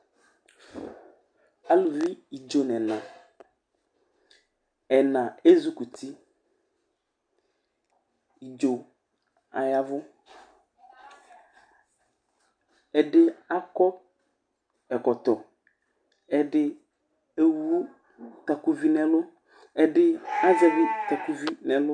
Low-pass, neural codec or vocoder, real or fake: 9.9 kHz; none; real